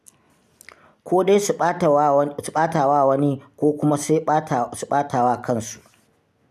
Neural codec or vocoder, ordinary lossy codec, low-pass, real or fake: none; none; 14.4 kHz; real